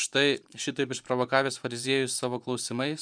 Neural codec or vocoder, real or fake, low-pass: vocoder, 24 kHz, 100 mel bands, Vocos; fake; 9.9 kHz